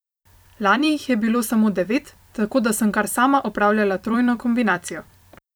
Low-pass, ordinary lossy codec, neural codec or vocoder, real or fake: none; none; vocoder, 44.1 kHz, 128 mel bands every 256 samples, BigVGAN v2; fake